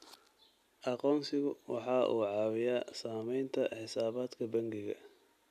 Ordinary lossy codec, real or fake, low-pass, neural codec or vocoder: none; real; 14.4 kHz; none